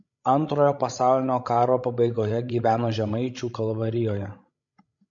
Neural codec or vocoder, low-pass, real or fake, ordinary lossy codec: codec, 16 kHz, 16 kbps, FreqCodec, larger model; 7.2 kHz; fake; MP3, 48 kbps